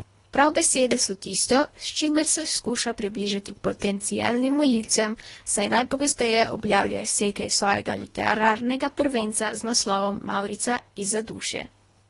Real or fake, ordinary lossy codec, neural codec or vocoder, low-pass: fake; AAC, 32 kbps; codec, 24 kHz, 1.5 kbps, HILCodec; 10.8 kHz